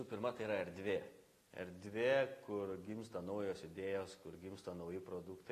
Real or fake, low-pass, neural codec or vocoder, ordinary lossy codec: real; 14.4 kHz; none; AAC, 48 kbps